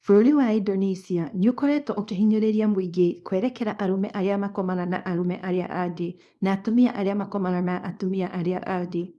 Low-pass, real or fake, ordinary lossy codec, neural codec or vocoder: none; fake; none; codec, 24 kHz, 0.9 kbps, WavTokenizer, small release